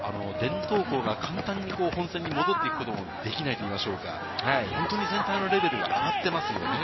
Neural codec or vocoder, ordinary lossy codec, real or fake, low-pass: none; MP3, 24 kbps; real; 7.2 kHz